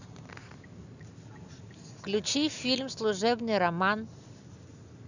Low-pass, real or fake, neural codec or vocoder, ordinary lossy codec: 7.2 kHz; real; none; none